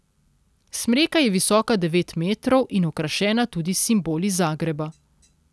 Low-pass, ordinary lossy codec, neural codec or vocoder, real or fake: none; none; none; real